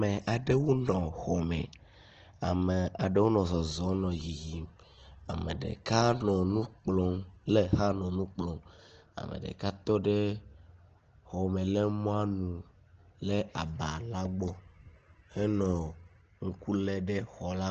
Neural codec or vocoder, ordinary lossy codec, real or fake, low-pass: none; Opus, 16 kbps; real; 7.2 kHz